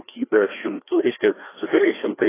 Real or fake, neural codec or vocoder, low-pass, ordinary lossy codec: fake; codec, 16 kHz, 2 kbps, FreqCodec, larger model; 3.6 kHz; AAC, 16 kbps